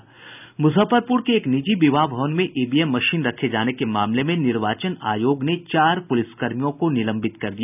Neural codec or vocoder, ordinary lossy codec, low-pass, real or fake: none; none; 3.6 kHz; real